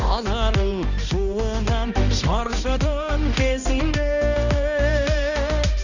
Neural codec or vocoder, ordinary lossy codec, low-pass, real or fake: codec, 16 kHz, 1 kbps, X-Codec, HuBERT features, trained on balanced general audio; none; 7.2 kHz; fake